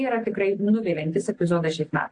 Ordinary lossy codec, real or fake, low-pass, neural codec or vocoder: AAC, 48 kbps; real; 9.9 kHz; none